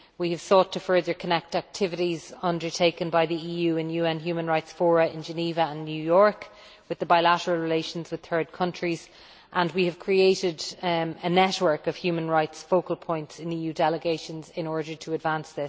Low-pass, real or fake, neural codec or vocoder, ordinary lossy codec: none; real; none; none